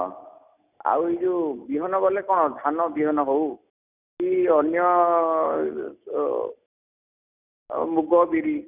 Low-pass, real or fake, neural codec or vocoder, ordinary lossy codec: 3.6 kHz; real; none; none